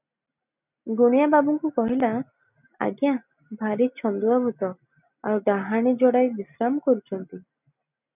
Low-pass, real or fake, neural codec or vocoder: 3.6 kHz; real; none